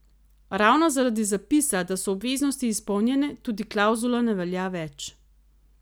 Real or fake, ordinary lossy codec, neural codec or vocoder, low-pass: real; none; none; none